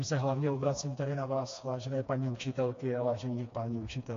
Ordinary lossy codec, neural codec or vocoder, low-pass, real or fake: AAC, 48 kbps; codec, 16 kHz, 2 kbps, FreqCodec, smaller model; 7.2 kHz; fake